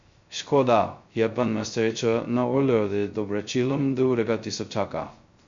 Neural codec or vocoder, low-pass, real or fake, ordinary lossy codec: codec, 16 kHz, 0.2 kbps, FocalCodec; 7.2 kHz; fake; MP3, 48 kbps